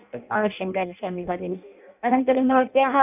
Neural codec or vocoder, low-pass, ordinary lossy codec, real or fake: codec, 16 kHz in and 24 kHz out, 0.6 kbps, FireRedTTS-2 codec; 3.6 kHz; none; fake